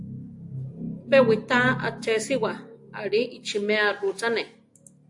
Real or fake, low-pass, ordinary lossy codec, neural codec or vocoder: real; 10.8 kHz; AAC, 64 kbps; none